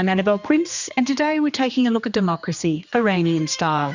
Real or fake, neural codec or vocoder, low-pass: fake; codec, 16 kHz, 2 kbps, X-Codec, HuBERT features, trained on general audio; 7.2 kHz